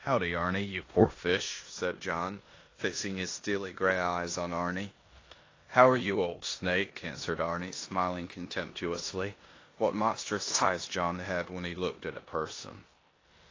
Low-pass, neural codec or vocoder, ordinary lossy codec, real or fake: 7.2 kHz; codec, 16 kHz in and 24 kHz out, 0.9 kbps, LongCat-Audio-Codec, four codebook decoder; AAC, 32 kbps; fake